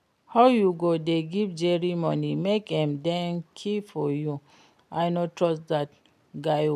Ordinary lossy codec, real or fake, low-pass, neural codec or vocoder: none; real; 14.4 kHz; none